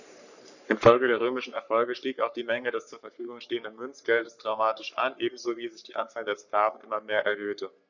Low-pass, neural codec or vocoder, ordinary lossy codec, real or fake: 7.2 kHz; codec, 44.1 kHz, 3.4 kbps, Pupu-Codec; none; fake